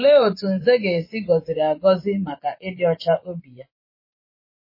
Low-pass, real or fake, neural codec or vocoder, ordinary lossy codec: 5.4 kHz; fake; vocoder, 24 kHz, 100 mel bands, Vocos; MP3, 24 kbps